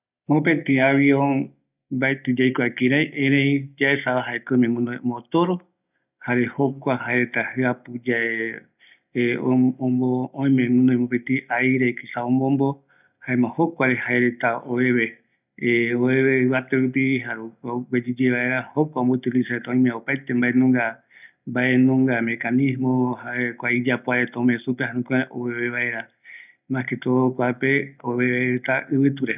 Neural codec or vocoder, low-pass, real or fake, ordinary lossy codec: none; 3.6 kHz; real; none